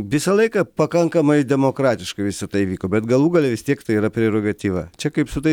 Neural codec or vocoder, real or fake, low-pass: none; real; 19.8 kHz